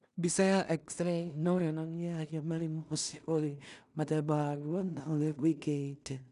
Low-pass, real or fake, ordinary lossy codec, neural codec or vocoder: 10.8 kHz; fake; none; codec, 16 kHz in and 24 kHz out, 0.4 kbps, LongCat-Audio-Codec, two codebook decoder